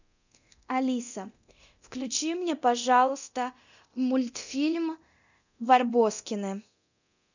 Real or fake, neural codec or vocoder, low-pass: fake; codec, 24 kHz, 0.9 kbps, DualCodec; 7.2 kHz